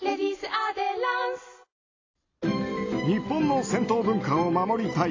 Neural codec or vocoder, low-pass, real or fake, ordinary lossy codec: none; 7.2 kHz; real; AAC, 32 kbps